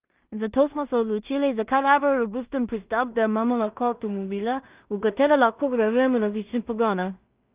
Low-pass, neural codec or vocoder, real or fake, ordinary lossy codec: 3.6 kHz; codec, 16 kHz in and 24 kHz out, 0.4 kbps, LongCat-Audio-Codec, two codebook decoder; fake; Opus, 24 kbps